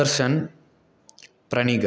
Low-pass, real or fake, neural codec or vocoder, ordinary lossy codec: none; real; none; none